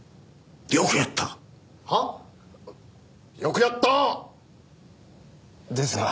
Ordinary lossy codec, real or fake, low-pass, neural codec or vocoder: none; real; none; none